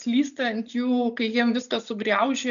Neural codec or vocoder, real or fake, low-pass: none; real; 7.2 kHz